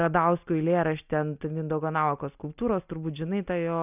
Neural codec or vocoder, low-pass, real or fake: none; 3.6 kHz; real